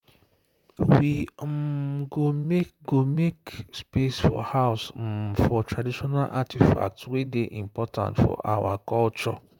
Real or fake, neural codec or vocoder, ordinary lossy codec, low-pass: fake; vocoder, 44.1 kHz, 128 mel bands, Pupu-Vocoder; none; 19.8 kHz